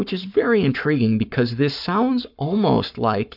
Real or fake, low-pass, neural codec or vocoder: fake; 5.4 kHz; codec, 44.1 kHz, 7.8 kbps, Pupu-Codec